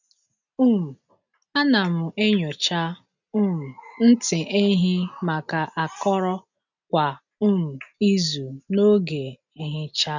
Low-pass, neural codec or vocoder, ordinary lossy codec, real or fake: 7.2 kHz; none; none; real